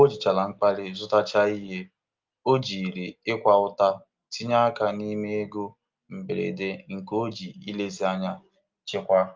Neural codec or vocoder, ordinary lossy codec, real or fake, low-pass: none; Opus, 32 kbps; real; 7.2 kHz